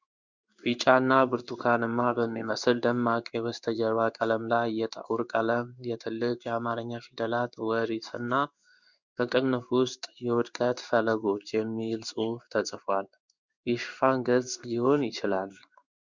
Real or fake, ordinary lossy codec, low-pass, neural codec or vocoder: fake; Opus, 64 kbps; 7.2 kHz; codec, 16 kHz in and 24 kHz out, 1 kbps, XY-Tokenizer